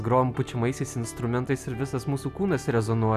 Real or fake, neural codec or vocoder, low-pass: real; none; 14.4 kHz